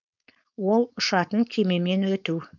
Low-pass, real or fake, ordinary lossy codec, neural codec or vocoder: 7.2 kHz; fake; none; codec, 16 kHz, 4.8 kbps, FACodec